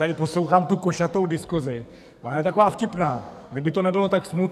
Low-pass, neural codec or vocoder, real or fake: 14.4 kHz; codec, 44.1 kHz, 2.6 kbps, SNAC; fake